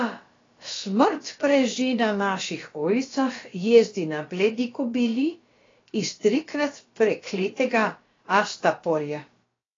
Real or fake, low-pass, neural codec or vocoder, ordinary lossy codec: fake; 7.2 kHz; codec, 16 kHz, about 1 kbps, DyCAST, with the encoder's durations; AAC, 32 kbps